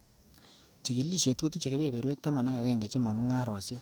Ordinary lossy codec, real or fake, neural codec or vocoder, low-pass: none; fake; codec, 44.1 kHz, 2.6 kbps, DAC; none